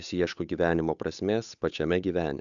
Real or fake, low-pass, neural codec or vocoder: fake; 7.2 kHz; codec, 16 kHz, 8 kbps, FunCodec, trained on Chinese and English, 25 frames a second